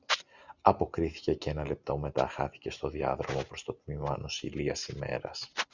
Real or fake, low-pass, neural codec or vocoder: real; 7.2 kHz; none